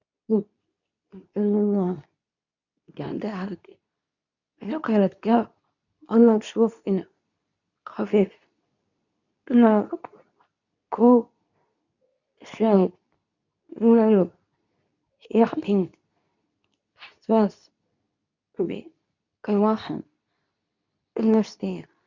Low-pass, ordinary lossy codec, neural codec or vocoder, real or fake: 7.2 kHz; none; codec, 24 kHz, 0.9 kbps, WavTokenizer, medium speech release version 2; fake